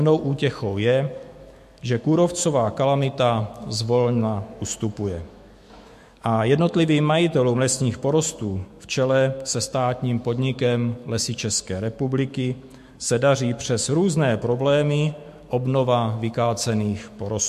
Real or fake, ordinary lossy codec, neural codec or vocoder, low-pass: fake; MP3, 64 kbps; autoencoder, 48 kHz, 128 numbers a frame, DAC-VAE, trained on Japanese speech; 14.4 kHz